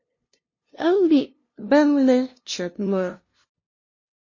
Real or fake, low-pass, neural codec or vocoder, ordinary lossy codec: fake; 7.2 kHz; codec, 16 kHz, 0.5 kbps, FunCodec, trained on LibriTTS, 25 frames a second; MP3, 32 kbps